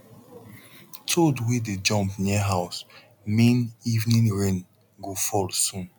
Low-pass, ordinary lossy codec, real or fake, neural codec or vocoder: 19.8 kHz; none; real; none